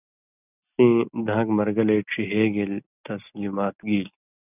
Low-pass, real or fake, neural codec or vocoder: 3.6 kHz; real; none